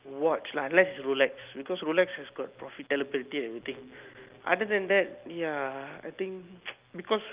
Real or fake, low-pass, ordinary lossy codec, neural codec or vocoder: real; 3.6 kHz; Opus, 32 kbps; none